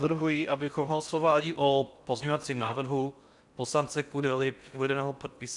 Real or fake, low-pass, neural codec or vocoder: fake; 10.8 kHz; codec, 16 kHz in and 24 kHz out, 0.6 kbps, FocalCodec, streaming, 4096 codes